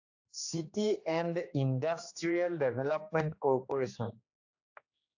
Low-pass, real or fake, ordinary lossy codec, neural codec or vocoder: 7.2 kHz; fake; AAC, 48 kbps; codec, 16 kHz, 2 kbps, X-Codec, HuBERT features, trained on general audio